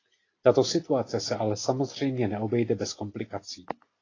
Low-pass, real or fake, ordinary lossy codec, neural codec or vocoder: 7.2 kHz; real; AAC, 32 kbps; none